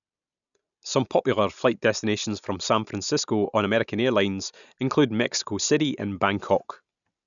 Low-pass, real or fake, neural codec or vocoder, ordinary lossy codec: 7.2 kHz; real; none; none